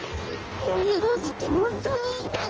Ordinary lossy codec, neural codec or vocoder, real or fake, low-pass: Opus, 24 kbps; codec, 16 kHz in and 24 kHz out, 0.6 kbps, FireRedTTS-2 codec; fake; 7.2 kHz